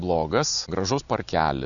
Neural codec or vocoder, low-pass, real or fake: none; 7.2 kHz; real